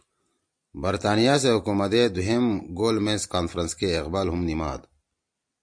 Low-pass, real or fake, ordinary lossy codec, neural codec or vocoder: 9.9 kHz; real; MP3, 64 kbps; none